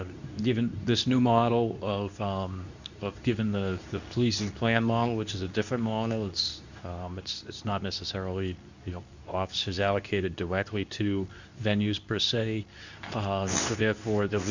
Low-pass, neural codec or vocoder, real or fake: 7.2 kHz; codec, 24 kHz, 0.9 kbps, WavTokenizer, medium speech release version 2; fake